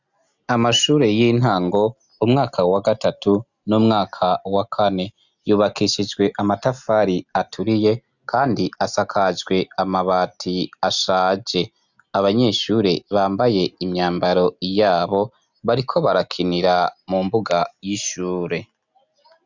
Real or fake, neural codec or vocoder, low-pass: real; none; 7.2 kHz